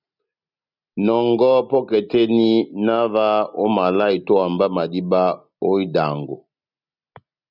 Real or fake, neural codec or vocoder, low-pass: real; none; 5.4 kHz